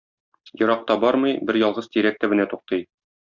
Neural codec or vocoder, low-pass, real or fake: none; 7.2 kHz; real